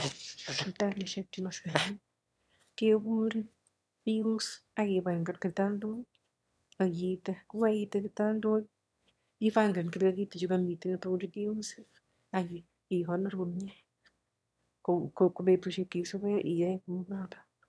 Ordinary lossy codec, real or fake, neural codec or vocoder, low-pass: none; fake; autoencoder, 22.05 kHz, a latent of 192 numbers a frame, VITS, trained on one speaker; none